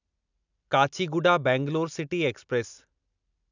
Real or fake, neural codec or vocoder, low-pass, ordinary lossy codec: real; none; 7.2 kHz; none